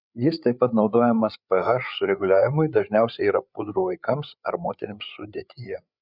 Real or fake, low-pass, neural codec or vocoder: fake; 5.4 kHz; codec, 16 kHz, 8 kbps, FreqCodec, larger model